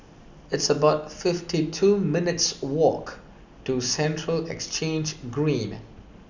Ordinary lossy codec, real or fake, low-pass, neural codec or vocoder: none; real; 7.2 kHz; none